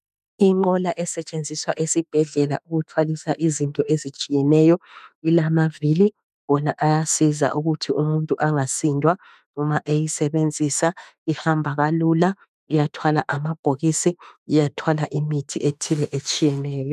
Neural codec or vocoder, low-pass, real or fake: autoencoder, 48 kHz, 32 numbers a frame, DAC-VAE, trained on Japanese speech; 14.4 kHz; fake